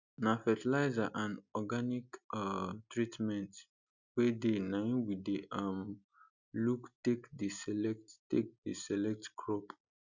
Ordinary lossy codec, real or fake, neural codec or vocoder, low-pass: none; real; none; 7.2 kHz